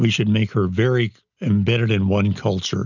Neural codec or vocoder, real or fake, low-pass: none; real; 7.2 kHz